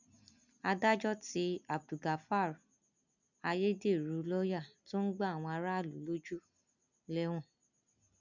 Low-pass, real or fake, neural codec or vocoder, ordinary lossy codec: 7.2 kHz; real; none; none